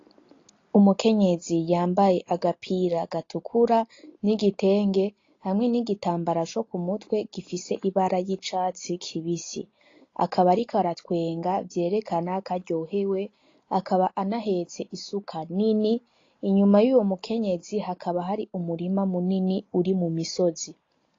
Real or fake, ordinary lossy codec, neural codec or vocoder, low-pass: real; AAC, 32 kbps; none; 7.2 kHz